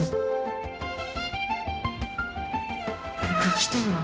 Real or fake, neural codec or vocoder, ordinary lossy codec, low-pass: fake; codec, 16 kHz, 0.5 kbps, X-Codec, HuBERT features, trained on general audio; none; none